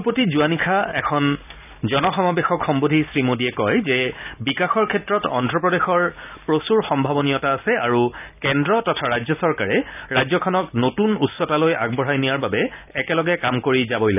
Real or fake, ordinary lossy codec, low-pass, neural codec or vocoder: real; none; 3.6 kHz; none